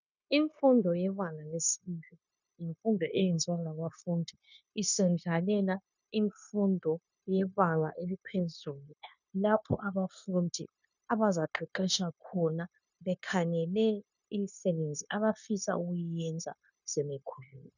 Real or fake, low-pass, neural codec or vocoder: fake; 7.2 kHz; codec, 16 kHz, 0.9 kbps, LongCat-Audio-Codec